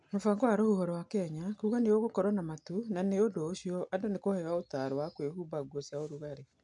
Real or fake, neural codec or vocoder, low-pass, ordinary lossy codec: real; none; 10.8 kHz; MP3, 64 kbps